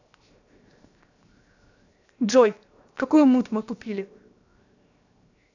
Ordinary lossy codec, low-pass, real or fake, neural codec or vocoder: none; 7.2 kHz; fake; codec, 16 kHz, 0.7 kbps, FocalCodec